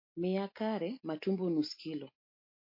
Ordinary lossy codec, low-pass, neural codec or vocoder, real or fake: MP3, 32 kbps; 5.4 kHz; none; real